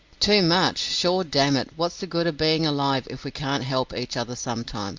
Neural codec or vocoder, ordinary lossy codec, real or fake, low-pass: none; Opus, 32 kbps; real; 7.2 kHz